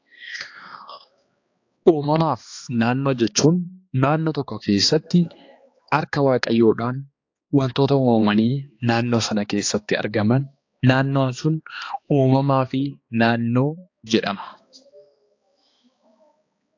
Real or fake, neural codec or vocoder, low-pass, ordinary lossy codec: fake; codec, 16 kHz, 2 kbps, X-Codec, HuBERT features, trained on balanced general audio; 7.2 kHz; AAC, 48 kbps